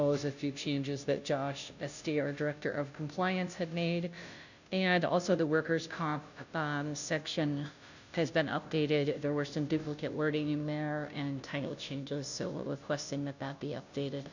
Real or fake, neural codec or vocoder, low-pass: fake; codec, 16 kHz, 0.5 kbps, FunCodec, trained on Chinese and English, 25 frames a second; 7.2 kHz